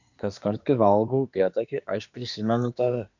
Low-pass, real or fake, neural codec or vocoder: 7.2 kHz; fake; codec, 24 kHz, 1 kbps, SNAC